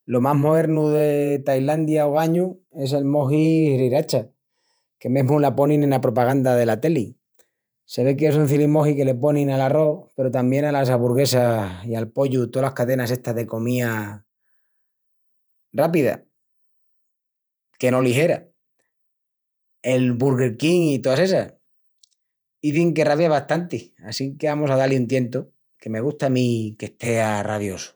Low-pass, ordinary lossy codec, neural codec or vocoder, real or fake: none; none; vocoder, 48 kHz, 128 mel bands, Vocos; fake